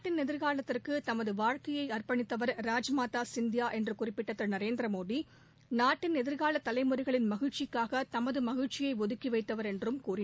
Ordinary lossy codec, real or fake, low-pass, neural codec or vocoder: none; real; none; none